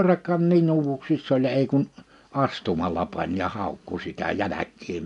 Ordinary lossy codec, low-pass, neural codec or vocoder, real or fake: none; 10.8 kHz; none; real